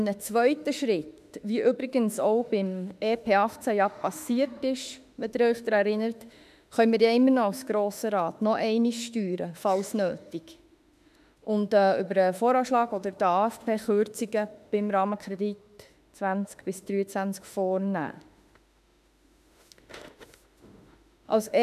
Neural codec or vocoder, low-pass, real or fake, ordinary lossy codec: autoencoder, 48 kHz, 32 numbers a frame, DAC-VAE, trained on Japanese speech; 14.4 kHz; fake; none